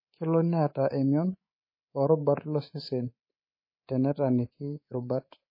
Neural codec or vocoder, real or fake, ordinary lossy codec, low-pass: codec, 24 kHz, 3.1 kbps, DualCodec; fake; MP3, 24 kbps; 5.4 kHz